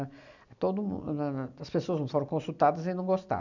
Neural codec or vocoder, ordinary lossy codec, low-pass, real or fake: none; none; 7.2 kHz; real